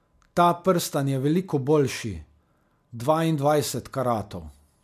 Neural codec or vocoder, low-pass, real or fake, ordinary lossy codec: none; 14.4 kHz; real; MP3, 96 kbps